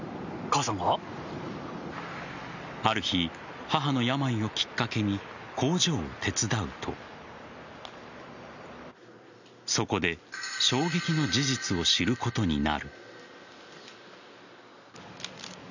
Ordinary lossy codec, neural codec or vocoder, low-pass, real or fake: none; none; 7.2 kHz; real